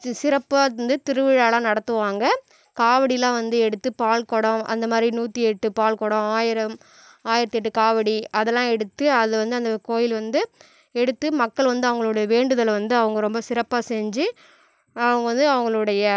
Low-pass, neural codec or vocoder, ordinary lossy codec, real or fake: none; none; none; real